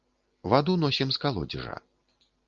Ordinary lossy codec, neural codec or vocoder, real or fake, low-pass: Opus, 32 kbps; none; real; 7.2 kHz